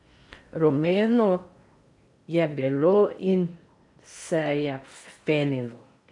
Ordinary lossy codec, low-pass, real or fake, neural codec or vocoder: AAC, 64 kbps; 10.8 kHz; fake; codec, 16 kHz in and 24 kHz out, 0.8 kbps, FocalCodec, streaming, 65536 codes